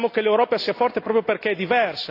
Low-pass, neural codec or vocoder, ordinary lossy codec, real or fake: 5.4 kHz; none; AAC, 32 kbps; real